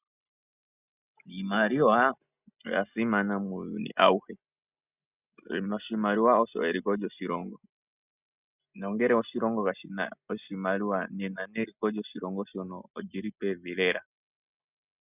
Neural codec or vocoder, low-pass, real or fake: none; 3.6 kHz; real